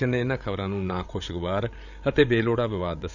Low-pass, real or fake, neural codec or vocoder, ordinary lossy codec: 7.2 kHz; fake; codec, 16 kHz, 16 kbps, FreqCodec, larger model; none